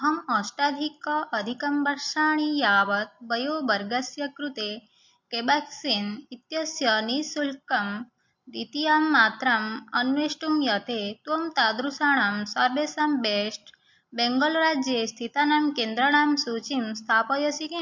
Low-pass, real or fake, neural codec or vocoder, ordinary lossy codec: 7.2 kHz; real; none; MP3, 48 kbps